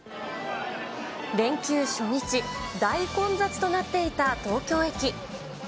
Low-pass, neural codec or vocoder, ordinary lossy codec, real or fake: none; none; none; real